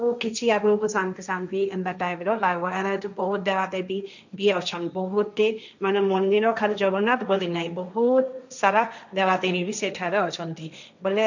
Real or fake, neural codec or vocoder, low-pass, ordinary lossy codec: fake; codec, 16 kHz, 1.1 kbps, Voila-Tokenizer; 7.2 kHz; none